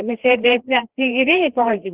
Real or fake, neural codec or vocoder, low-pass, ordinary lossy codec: fake; codec, 16 kHz, 2 kbps, FreqCodec, larger model; 3.6 kHz; Opus, 16 kbps